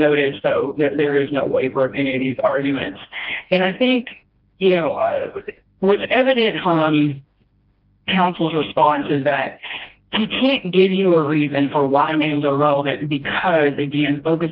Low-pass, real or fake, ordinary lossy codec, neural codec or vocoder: 5.4 kHz; fake; Opus, 32 kbps; codec, 16 kHz, 1 kbps, FreqCodec, smaller model